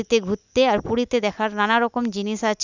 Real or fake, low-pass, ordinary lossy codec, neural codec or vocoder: real; 7.2 kHz; none; none